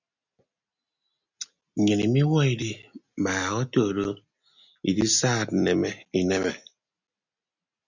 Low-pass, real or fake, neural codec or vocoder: 7.2 kHz; real; none